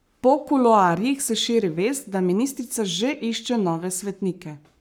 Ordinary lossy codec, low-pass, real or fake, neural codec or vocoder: none; none; fake; codec, 44.1 kHz, 7.8 kbps, Pupu-Codec